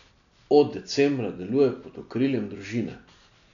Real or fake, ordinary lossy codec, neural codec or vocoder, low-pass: real; none; none; 7.2 kHz